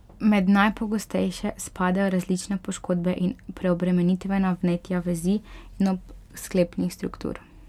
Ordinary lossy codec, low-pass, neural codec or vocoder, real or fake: MP3, 96 kbps; 19.8 kHz; none; real